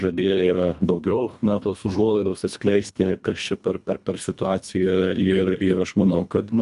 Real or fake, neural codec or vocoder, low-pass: fake; codec, 24 kHz, 1.5 kbps, HILCodec; 10.8 kHz